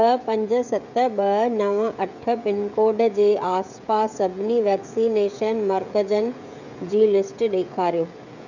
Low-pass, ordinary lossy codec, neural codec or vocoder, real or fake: 7.2 kHz; none; codec, 16 kHz, 16 kbps, FreqCodec, smaller model; fake